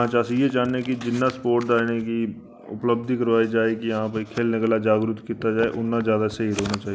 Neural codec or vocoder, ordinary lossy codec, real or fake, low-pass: none; none; real; none